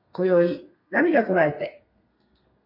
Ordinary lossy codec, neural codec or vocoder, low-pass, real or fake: MP3, 32 kbps; codec, 32 kHz, 1.9 kbps, SNAC; 5.4 kHz; fake